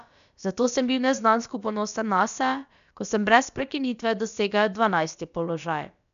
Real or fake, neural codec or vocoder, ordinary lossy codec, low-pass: fake; codec, 16 kHz, about 1 kbps, DyCAST, with the encoder's durations; none; 7.2 kHz